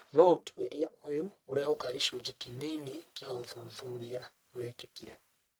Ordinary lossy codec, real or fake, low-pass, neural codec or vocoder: none; fake; none; codec, 44.1 kHz, 1.7 kbps, Pupu-Codec